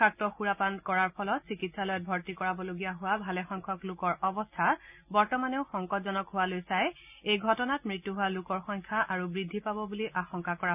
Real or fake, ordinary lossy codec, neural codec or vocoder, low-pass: real; none; none; 3.6 kHz